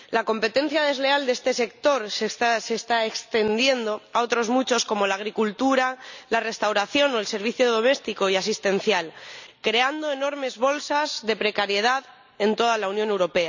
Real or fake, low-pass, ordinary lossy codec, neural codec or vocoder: real; 7.2 kHz; none; none